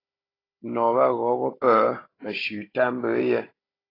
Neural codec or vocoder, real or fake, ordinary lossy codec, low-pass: codec, 16 kHz, 16 kbps, FunCodec, trained on Chinese and English, 50 frames a second; fake; AAC, 24 kbps; 5.4 kHz